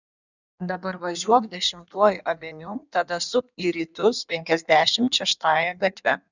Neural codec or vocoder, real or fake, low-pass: codec, 16 kHz in and 24 kHz out, 1.1 kbps, FireRedTTS-2 codec; fake; 7.2 kHz